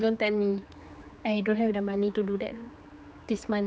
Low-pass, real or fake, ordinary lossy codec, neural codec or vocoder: none; fake; none; codec, 16 kHz, 4 kbps, X-Codec, HuBERT features, trained on general audio